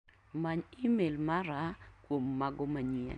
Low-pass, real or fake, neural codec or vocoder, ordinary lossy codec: 9.9 kHz; real; none; none